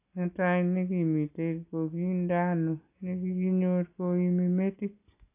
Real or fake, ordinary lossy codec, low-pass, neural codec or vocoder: real; AAC, 24 kbps; 3.6 kHz; none